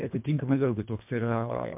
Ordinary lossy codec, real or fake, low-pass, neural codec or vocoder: MP3, 32 kbps; fake; 3.6 kHz; codec, 24 kHz, 1.5 kbps, HILCodec